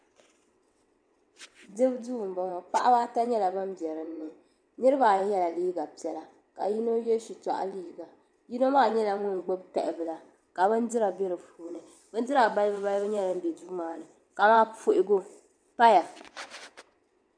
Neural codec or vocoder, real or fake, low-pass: vocoder, 22.05 kHz, 80 mel bands, WaveNeXt; fake; 9.9 kHz